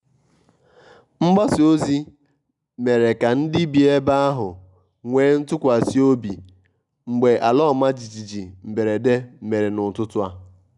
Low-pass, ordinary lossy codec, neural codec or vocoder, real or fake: 10.8 kHz; none; none; real